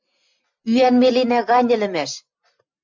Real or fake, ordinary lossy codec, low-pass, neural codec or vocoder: real; MP3, 64 kbps; 7.2 kHz; none